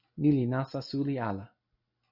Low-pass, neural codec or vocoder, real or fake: 5.4 kHz; none; real